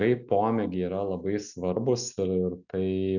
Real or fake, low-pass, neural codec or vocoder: real; 7.2 kHz; none